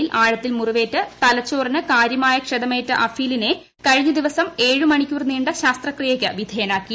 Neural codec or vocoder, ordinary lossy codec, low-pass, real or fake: none; none; none; real